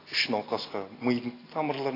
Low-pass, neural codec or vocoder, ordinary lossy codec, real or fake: 5.4 kHz; none; AAC, 24 kbps; real